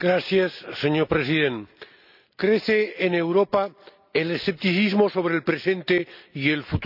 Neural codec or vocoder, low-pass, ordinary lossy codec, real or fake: none; 5.4 kHz; none; real